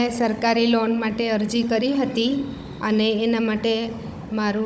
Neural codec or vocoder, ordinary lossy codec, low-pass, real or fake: codec, 16 kHz, 16 kbps, FunCodec, trained on Chinese and English, 50 frames a second; none; none; fake